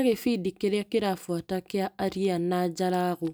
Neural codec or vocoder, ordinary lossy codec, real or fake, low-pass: none; none; real; none